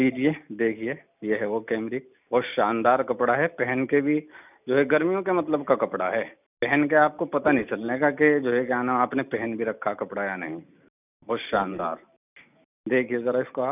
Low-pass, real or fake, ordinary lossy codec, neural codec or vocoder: 3.6 kHz; real; none; none